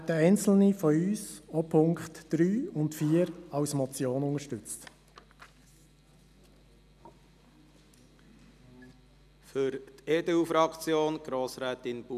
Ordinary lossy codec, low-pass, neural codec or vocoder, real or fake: none; 14.4 kHz; none; real